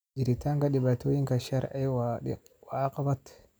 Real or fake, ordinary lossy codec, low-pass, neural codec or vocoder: real; none; none; none